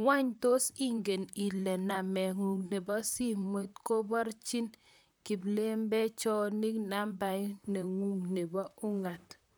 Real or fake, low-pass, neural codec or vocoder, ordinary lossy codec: fake; none; vocoder, 44.1 kHz, 128 mel bands, Pupu-Vocoder; none